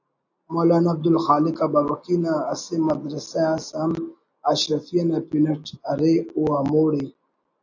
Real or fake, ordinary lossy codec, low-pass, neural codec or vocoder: real; AAC, 48 kbps; 7.2 kHz; none